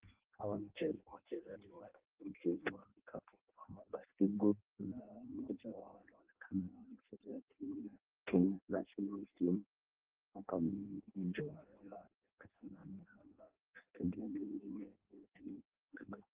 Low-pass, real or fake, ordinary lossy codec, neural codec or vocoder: 3.6 kHz; fake; Opus, 16 kbps; codec, 16 kHz in and 24 kHz out, 0.6 kbps, FireRedTTS-2 codec